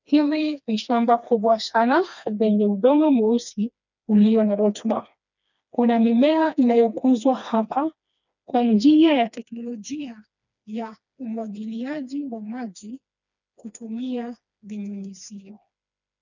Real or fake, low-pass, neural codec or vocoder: fake; 7.2 kHz; codec, 16 kHz, 2 kbps, FreqCodec, smaller model